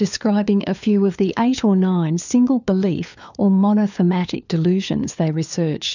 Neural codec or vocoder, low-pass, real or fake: codec, 16 kHz, 4 kbps, FunCodec, trained on LibriTTS, 50 frames a second; 7.2 kHz; fake